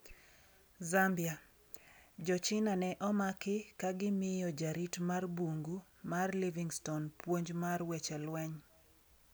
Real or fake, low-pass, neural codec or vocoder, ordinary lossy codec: real; none; none; none